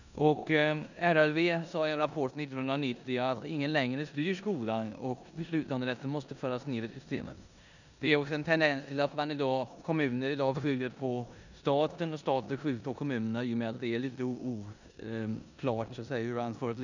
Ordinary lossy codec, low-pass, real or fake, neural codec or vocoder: none; 7.2 kHz; fake; codec, 16 kHz in and 24 kHz out, 0.9 kbps, LongCat-Audio-Codec, four codebook decoder